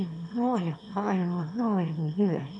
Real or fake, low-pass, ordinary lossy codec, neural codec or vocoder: fake; none; none; autoencoder, 22.05 kHz, a latent of 192 numbers a frame, VITS, trained on one speaker